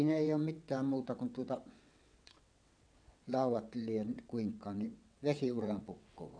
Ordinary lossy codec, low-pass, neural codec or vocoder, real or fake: none; 9.9 kHz; vocoder, 22.05 kHz, 80 mel bands, WaveNeXt; fake